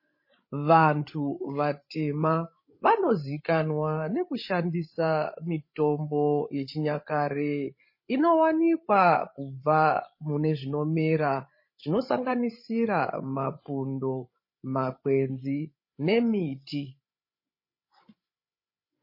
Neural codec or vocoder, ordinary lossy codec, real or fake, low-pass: codec, 16 kHz, 16 kbps, FreqCodec, larger model; MP3, 24 kbps; fake; 5.4 kHz